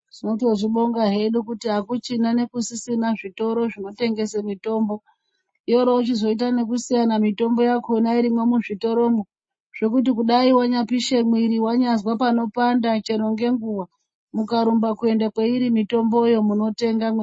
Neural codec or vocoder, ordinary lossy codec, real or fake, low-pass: none; MP3, 32 kbps; real; 9.9 kHz